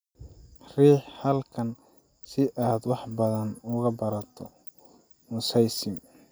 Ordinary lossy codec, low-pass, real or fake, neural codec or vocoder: none; none; real; none